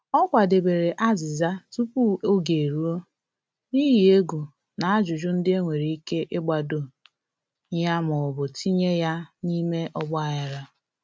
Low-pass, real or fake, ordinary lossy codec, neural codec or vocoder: none; real; none; none